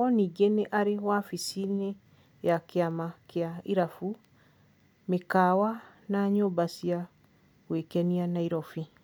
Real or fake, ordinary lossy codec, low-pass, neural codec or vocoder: real; none; none; none